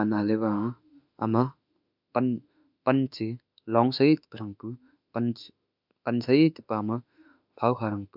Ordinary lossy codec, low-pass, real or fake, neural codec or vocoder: none; 5.4 kHz; fake; autoencoder, 48 kHz, 32 numbers a frame, DAC-VAE, trained on Japanese speech